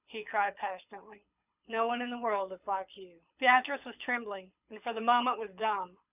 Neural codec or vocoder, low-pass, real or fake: codec, 24 kHz, 6 kbps, HILCodec; 3.6 kHz; fake